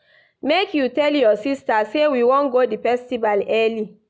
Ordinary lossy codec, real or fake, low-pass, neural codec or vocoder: none; real; none; none